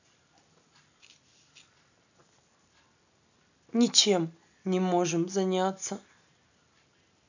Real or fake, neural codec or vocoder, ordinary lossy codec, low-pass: real; none; none; 7.2 kHz